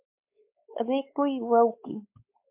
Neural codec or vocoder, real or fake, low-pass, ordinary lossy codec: codec, 16 kHz, 4 kbps, X-Codec, WavLM features, trained on Multilingual LibriSpeech; fake; 3.6 kHz; MP3, 32 kbps